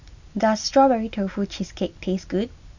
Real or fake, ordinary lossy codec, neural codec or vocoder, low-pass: real; none; none; 7.2 kHz